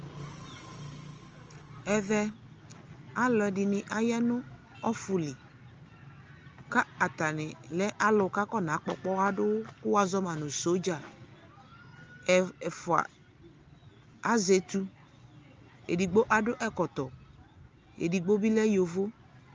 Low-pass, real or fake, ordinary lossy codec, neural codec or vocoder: 7.2 kHz; real; Opus, 24 kbps; none